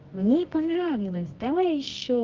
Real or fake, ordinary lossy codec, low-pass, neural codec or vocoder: fake; Opus, 32 kbps; 7.2 kHz; codec, 24 kHz, 0.9 kbps, WavTokenizer, medium music audio release